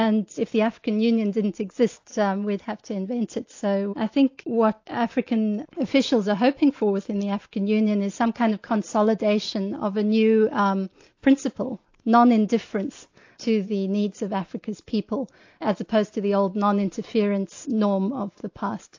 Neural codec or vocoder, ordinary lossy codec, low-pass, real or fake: none; AAC, 48 kbps; 7.2 kHz; real